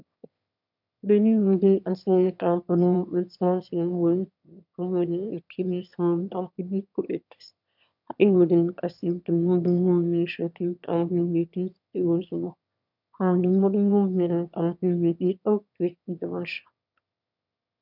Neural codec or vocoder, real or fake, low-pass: autoencoder, 22.05 kHz, a latent of 192 numbers a frame, VITS, trained on one speaker; fake; 5.4 kHz